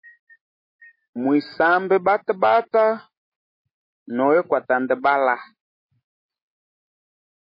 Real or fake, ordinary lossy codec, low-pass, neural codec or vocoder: real; MP3, 24 kbps; 5.4 kHz; none